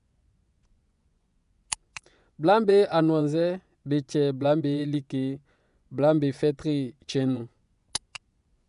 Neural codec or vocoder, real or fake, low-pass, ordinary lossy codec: vocoder, 24 kHz, 100 mel bands, Vocos; fake; 10.8 kHz; none